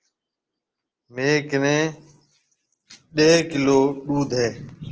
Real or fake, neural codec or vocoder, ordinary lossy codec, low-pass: real; none; Opus, 16 kbps; 7.2 kHz